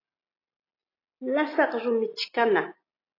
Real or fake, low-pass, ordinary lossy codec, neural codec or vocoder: real; 5.4 kHz; AAC, 24 kbps; none